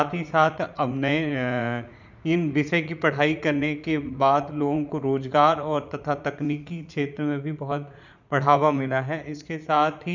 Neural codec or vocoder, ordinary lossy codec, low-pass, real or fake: vocoder, 44.1 kHz, 80 mel bands, Vocos; none; 7.2 kHz; fake